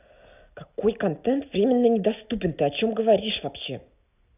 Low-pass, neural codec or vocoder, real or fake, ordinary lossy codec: 3.6 kHz; none; real; none